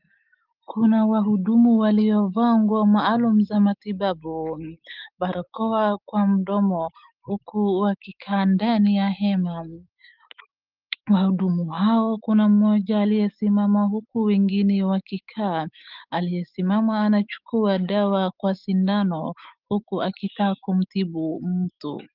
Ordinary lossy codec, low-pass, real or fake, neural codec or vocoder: Opus, 32 kbps; 5.4 kHz; fake; autoencoder, 48 kHz, 128 numbers a frame, DAC-VAE, trained on Japanese speech